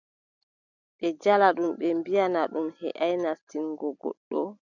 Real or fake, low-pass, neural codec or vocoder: real; 7.2 kHz; none